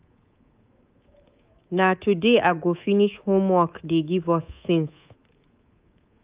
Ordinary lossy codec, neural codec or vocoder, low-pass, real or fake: Opus, 32 kbps; codec, 24 kHz, 3.1 kbps, DualCodec; 3.6 kHz; fake